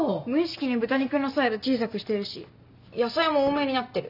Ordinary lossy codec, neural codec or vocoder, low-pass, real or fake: none; none; 5.4 kHz; real